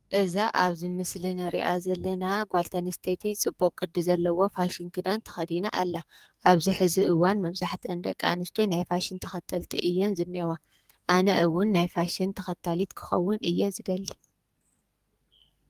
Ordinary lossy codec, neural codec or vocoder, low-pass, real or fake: Opus, 32 kbps; codec, 44.1 kHz, 2.6 kbps, SNAC; 14.4 kHz; fake